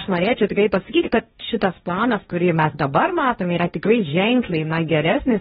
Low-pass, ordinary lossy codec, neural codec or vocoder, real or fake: 7.2 kHz; AAC, 16 kbps; codec, 16 kHz, 1.1 kbps, Voila-Tokenizer; fake